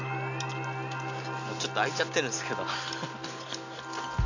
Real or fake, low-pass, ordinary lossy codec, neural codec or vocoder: real; 7.2 kHz; none; none